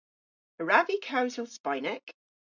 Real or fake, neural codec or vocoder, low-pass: real; none; 7.2 kHz